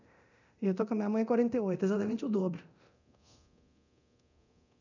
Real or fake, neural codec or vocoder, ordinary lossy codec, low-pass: fake; codec, 24 kHz, 0.9 kbps, DualCodec; none; 7.2 kHz